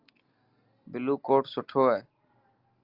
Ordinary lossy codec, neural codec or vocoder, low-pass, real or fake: Opus, 32 kbps; none; 5.4 kHz; real